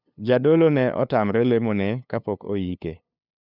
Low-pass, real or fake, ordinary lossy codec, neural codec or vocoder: 5.4 kHz; fake; none; codec, 16 kHz, 2 kbps, FunCodec, trained on LibriTTS, 25 frames a second